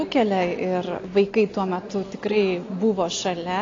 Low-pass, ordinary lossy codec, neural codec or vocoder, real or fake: 7.2 kHz; AAC, 32 kbps; none; real